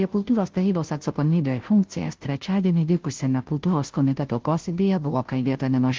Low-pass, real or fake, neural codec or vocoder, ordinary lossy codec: 7.2 kHz; fake; codec, 16 kHz, 0.5 kbps, FunCodec, trained on Chinese and English, 25 frames a second; Opus, 16 kbps